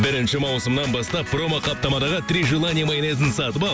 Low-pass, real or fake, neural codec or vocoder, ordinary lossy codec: none; real; none; none